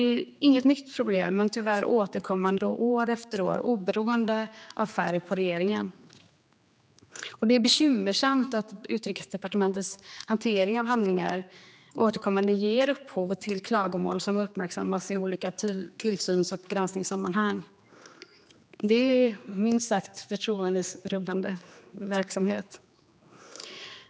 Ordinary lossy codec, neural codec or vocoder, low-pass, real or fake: none; codec, 16 kHz, 2 kbps, X-Codec, HuBERT features, trained on general audio; none; fake